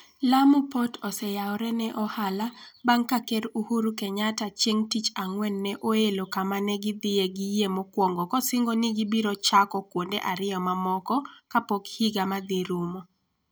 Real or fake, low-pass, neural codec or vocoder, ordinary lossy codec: real; none; none; none